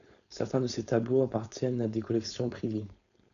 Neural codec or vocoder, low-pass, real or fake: codec, 16 kHz, 4.8 kbps, FACodec; 7.2 kHz; fake